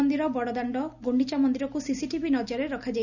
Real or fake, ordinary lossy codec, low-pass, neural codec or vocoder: real; none; 7.2 kHz; none